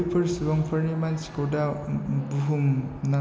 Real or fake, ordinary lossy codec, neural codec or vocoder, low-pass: real; none; none; none